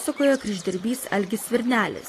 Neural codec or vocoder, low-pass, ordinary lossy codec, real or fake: none; 14.4 kHz; AAC, 48 kbps; real